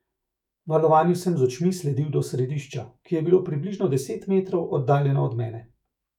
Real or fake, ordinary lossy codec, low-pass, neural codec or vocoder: fake; none; 19.8 kHz; autoencoder, 48 kHz, 128 numbers a frame, DAC-VAE, trained on Japanese speech